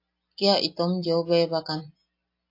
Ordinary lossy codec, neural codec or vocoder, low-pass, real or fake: AAC, 32 kbps; none; 5.4 kHz; real